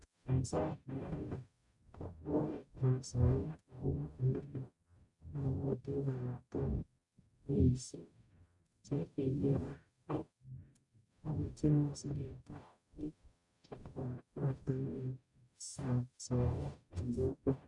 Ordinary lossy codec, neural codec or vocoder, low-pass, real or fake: none; codec, 44.1 kHz, 0.9 kbps, DAC; 10.8 kHz; fake